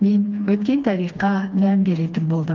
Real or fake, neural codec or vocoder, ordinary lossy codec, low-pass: fake; codec, 16 kHz, 2 kbps, FreqCodec, smaller model; Opus, 32 kbps; 7.2 kHz